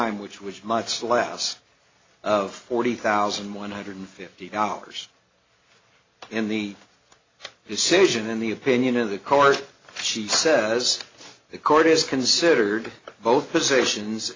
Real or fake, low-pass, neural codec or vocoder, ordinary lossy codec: real; 7.2 kHz; none; AAC, 32 kbps